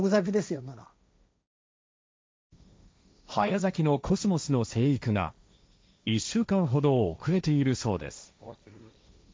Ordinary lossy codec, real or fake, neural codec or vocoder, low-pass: none; fake; codec, 16 kHz, 1.1 kbps, Voila-Tokenizer; none